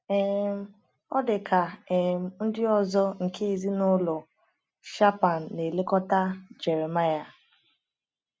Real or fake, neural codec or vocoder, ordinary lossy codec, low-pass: real; none; none; none